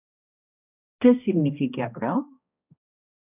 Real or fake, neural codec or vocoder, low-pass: fake; codec, 16 kHz, 1 kbps, X-Codec, HuBERT features, trained on balanced general audio; 3.6 kHz